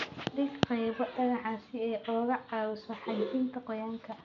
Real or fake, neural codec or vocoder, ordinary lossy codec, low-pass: real; none; none; 7.2 kHz